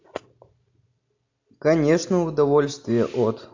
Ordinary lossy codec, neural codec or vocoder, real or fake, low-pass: AAC, 48 kbps; none; real; 7.2 kHz